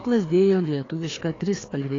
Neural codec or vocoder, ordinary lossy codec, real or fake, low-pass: codec, 16 kHz, 2 kbps, FreqCodec, larger model; AAC, 32 kbps; fake; 7.2 kHz